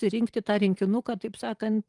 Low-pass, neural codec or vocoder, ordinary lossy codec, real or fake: 10.8 kHz; vocoder, 44.1 kHz, 128 mel bands every 512 samples, BigVGAN v2; Opus, 24 kbps; fake